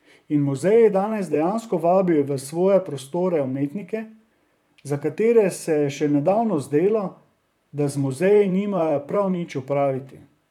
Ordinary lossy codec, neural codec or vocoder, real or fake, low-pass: none; vocoder, 44.1 kHz, 128 mel bands, Pupu-Vocoder; fake; 19.8 kHz